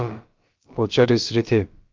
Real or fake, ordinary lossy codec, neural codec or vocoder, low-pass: fake; Opus, 32 kbps; codec, 16 kHz, about 1 kbps, DyCAST, with the encoder's durations; 7.2 kHz